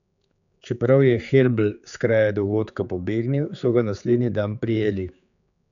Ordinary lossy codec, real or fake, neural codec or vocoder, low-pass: none; fake; codec, 16 kHz, 4 kbps, X-Codec, HuBERT features, trained on general audio; 7.2 kHz